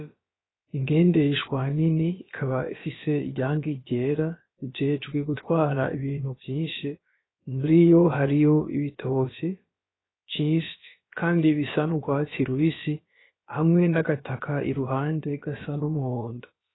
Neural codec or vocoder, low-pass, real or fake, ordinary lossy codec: codec, 16 kHz, about 1 kbps, DyCAST, with the encoder's durations; 7.2 kHz; fake; AAC, 16 kbps